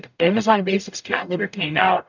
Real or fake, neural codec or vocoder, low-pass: fake; codec, 44.1 kHz, 0.9 kbps, DAC; 7.2 kHz